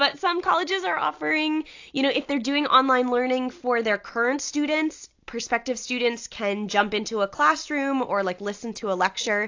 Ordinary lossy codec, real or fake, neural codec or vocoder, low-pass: AAC, 48 kbps; real; none; 7.2 kHz